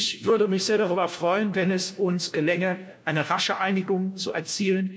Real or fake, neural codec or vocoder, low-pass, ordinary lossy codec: fake; codec, 16 kHz, 1 kbps, FunCodec, trained on LibriTTS, 50 frames a second; none; none